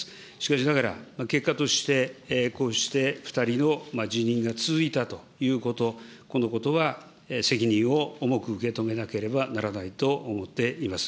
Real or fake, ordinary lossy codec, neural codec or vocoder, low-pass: real; none; none; none